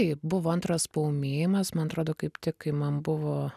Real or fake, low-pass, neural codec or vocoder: real; 14.4 kHz; none